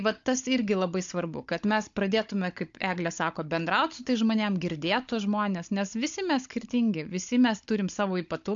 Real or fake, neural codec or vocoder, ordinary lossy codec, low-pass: fake; codec, 16 kHz, 16 kbps, FunCodec, trained on Chinese and English, 50 frames a second; AAC, 64 kbps; 7.2 kHz